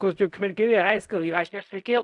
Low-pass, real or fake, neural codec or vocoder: 10.8 kHz; fake; codec, 16 kHz in and 24 kHz out, 0.4 kbps, LongCat-Audio-Codec, fine tuned four codebook decoder